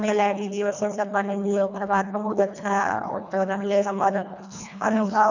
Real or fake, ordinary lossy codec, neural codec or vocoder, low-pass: fake; none; codec, 24 kHz, 1.5 kbps, HILCodec; 7.2 kHz